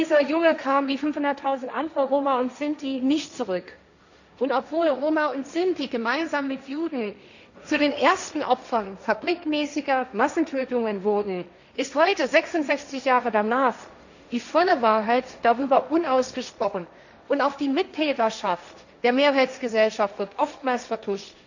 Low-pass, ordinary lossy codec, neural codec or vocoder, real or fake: 7.2 kHz; none; codec, 16 kHz, 1.1 kbps, Voila-Tokenizer; fake